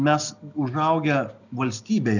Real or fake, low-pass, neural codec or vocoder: real; 7.2 kHz; none